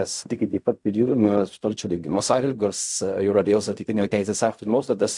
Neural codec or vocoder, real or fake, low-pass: codec, 16 kHz in and 24 kHz out, 0.4 kbps, LongCat-Audio-Codec, fine tuned four codebook decoder; fake; 10.8 kHz